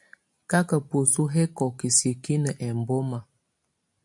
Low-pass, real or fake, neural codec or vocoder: 10.8 kHz; real; none